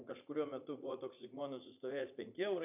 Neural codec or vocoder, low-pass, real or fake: vocoder, 44.1 kHz, 80 mel bands, Vocos; 3.6 kHz; fake